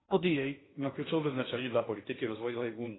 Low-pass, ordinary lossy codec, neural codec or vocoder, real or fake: 7.2 kHz; AAC, 16 kbps; codec, 16 kHz in and 24 kHz out, 0.6 kbps, FocalCodec, streaming, 2048 codes; fake